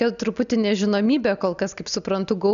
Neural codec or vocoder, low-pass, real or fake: none; 7.2 kHz; real